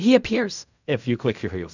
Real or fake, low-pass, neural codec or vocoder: fake; 7.2 kHz; codec, 16 kHz in and 24 kHz out, 0.4 kbps, LongCat-Audio-Codec, fine tuned four codebook decoder